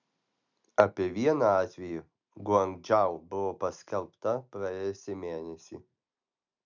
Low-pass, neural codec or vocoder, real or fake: 7.2 kHz; none; real